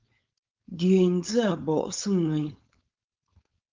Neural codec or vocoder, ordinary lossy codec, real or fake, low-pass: codec, 16 kHz, 4.8 kbps, FACodec; Opus, 24 kbps; fake; 7.2 kHz